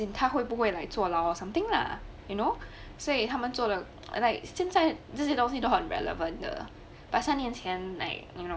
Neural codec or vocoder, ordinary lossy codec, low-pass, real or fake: none; none; none; real